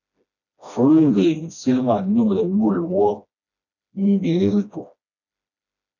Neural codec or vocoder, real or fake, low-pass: codec, 16 kHz, 1 kbps, FreqCodec, smaller model; fake; 7.2 kHz